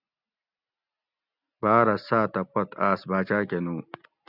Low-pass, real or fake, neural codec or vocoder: 5.4 kHz; real; none